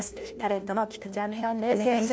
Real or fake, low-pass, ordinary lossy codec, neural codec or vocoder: fake; none; none; codec, 16 kHz, 1 kbps, FunCodec, trained on LibriTTS, 50 frames a second